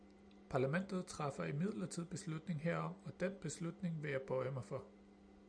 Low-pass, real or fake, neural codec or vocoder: 9.9 kHz; real; none